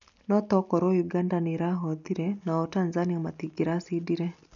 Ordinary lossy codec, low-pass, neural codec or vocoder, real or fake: none; 7.2 kHz; none; real